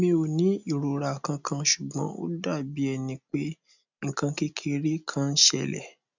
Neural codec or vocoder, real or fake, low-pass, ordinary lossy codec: none; real; 7.2 kHz; none